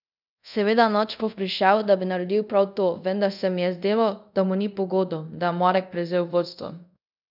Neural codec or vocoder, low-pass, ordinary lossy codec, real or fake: codec, 24 kHz, 0.5 kbps, DualCodec; 5.4 kHz; none; fake